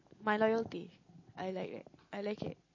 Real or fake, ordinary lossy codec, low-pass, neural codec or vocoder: real; MP3, 32 kbps; 7.2 kHz; none